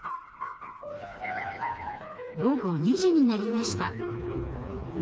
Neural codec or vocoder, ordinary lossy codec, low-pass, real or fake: codec, 16 kHz, 2 kbps, FreqCodec, smaller model; none; none; fake